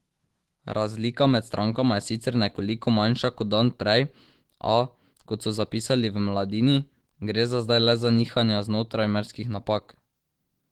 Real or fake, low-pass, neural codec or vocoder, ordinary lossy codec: fake; 19.8 kHz; autoencoder, 48 kHz, 128 numbers a frame, DAC-VAE, trained on Japanese speech; Opus, 16 kbps